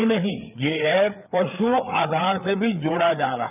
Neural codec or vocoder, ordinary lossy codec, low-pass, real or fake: codec, 16 kHz, 4 kbps, FreqCodec, larger model; none; 3.6 kHz; fake